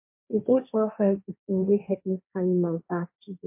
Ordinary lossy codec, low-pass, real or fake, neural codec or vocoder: MP3, 24 kbps; 3.6 kHz; fake; codec, 16 kHz, 1.1 kbps, Voila-Tokenizer